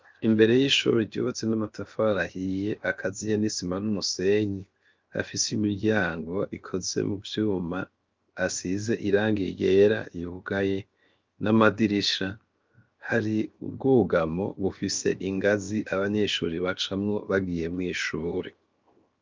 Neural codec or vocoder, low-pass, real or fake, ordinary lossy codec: codec, 16 kHz, 0.7 kbps, FocalCodec; 7.2 kHz; fake; Opus, 24 kbps